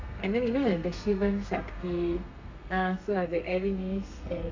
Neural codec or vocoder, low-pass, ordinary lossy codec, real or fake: codec, 32 kHz, 1.9 kbps, SNAC; 7.2 kHz; MP3, 64 kbps; fake